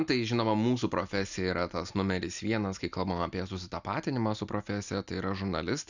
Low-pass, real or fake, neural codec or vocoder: 7.2 kHz; real; none